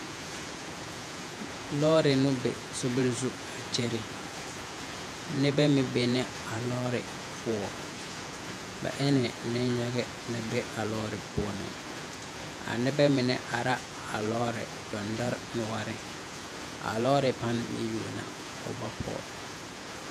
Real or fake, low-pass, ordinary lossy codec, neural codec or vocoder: fake; 14.4 kHz; MP3, 96 kbps; vocoder, 48 kHz, 128 mel bands, Vocos